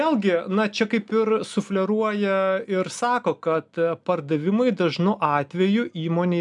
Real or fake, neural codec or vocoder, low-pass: real; none; 10.8 kHz